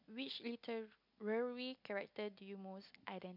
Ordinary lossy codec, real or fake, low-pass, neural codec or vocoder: MP3, 48 kbps; real; 5.4 kHz; none